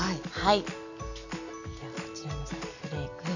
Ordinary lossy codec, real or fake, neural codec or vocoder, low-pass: none; real; none; 7.2 kHz